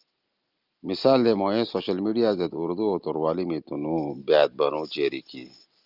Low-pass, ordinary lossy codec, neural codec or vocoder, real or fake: 5.4 kHz; Opus, 24 kbps; none; real